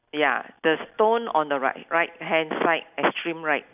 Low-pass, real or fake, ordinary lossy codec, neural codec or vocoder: 3.6 kHz; real; none; none